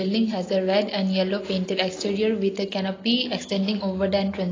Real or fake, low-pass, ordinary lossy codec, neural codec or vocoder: real; 7.2 kHz; AAC, 32 kbps; none